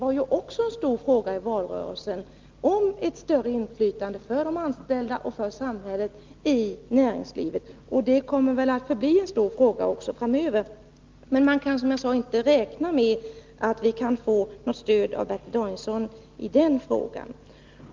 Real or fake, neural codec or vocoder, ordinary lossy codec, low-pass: real; none; Opus, 24 kbps; 7.2 kHz